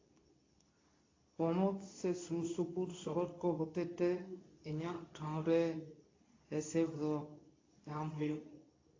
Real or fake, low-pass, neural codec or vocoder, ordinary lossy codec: fake; 7.2 kHz; codec, 24 kHz, 0.9 kbps, WavTokenizer, medium speech release version 2; AAC, 32 kbps